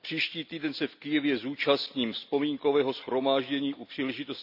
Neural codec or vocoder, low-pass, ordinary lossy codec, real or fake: none; 5.4 kHz; none; real